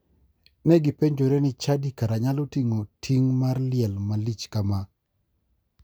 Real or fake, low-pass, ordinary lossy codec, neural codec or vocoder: real; none; none; none